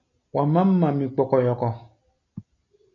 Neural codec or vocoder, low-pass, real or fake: none; 7.2 kHz; real